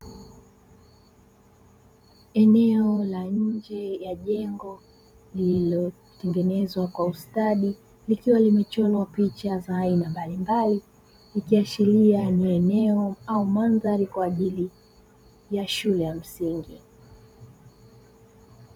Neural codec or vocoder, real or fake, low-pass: vocoder, 44.1 kHz, 128 mel bands every 512 samples, BigVGAN v2; fake; 19.8 kHz